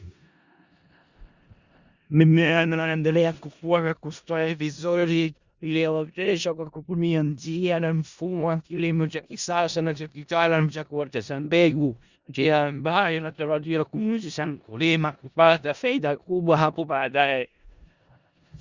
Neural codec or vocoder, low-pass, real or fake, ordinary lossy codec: codec, 16 kHz in and 24 kHz out, 0.4 kbps, LongCat-Audio-Codec, four codebook decoder; 7.2 kHz; fake; Opus, 64 kbps